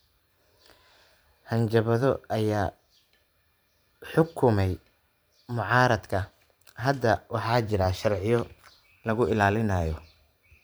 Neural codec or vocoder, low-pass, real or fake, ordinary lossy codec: vocoder, 44.1 kHz, 128 mel bands every 512 samples, BigVGAN v2; none; fake; none